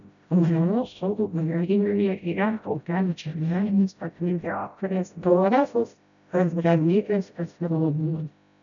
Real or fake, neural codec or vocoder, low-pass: fake; codec, 16 kHz, 0.5 kbps, FreqCodec, smaller model; 7.2 kHz